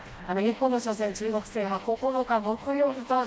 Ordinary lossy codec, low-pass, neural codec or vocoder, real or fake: none; none; codec, 16 kHz, 1 kbps, FreqCodec, smaller model; fake